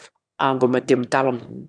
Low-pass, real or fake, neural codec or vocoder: 9.9 kHz; fake; autoencoder, 22.05 kHz, a latent of 192 numbers a frame, VITS, trained on one speaker